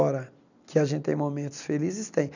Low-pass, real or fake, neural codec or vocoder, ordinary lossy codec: 7.2 kHz; real; none; none